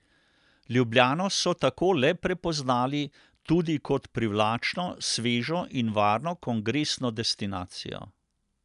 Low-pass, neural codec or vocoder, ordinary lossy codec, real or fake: 10.8 kHz; none; none; real